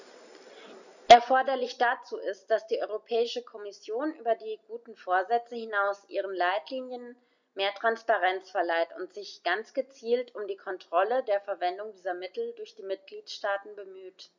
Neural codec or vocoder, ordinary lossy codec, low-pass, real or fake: none; none; 7.2 kHz; real